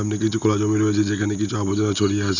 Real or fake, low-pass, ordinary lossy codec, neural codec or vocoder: real; 7.2 kHz; none; none